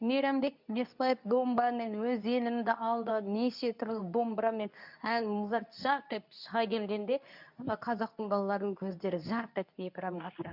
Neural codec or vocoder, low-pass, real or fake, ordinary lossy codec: codec, 24 kHz, 0.9 kbps, WavTokenizer, medium speech release version 2; 5.4 kHz; fake; none